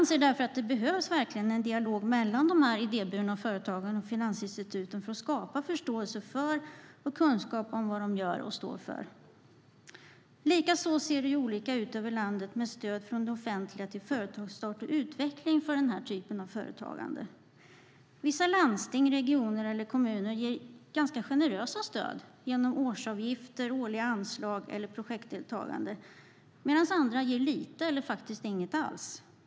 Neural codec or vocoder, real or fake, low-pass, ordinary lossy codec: none; real; none; none